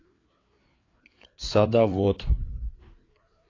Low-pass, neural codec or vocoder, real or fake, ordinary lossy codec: 7.2 kHz; codec, 16 kHz, 4 kbps, FreqCodec, larger model; fake; AAC, 32 kbps